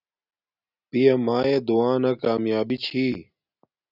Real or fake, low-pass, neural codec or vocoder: real; 5.4 kHz; none